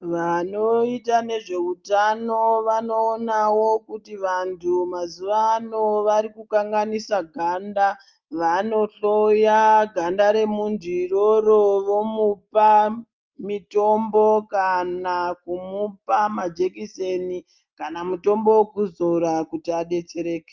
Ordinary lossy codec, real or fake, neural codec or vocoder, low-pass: Opus, 24 kbps; real; none; 7.2 kHz